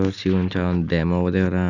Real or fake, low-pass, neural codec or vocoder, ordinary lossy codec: real; 7.2 kHz; none; none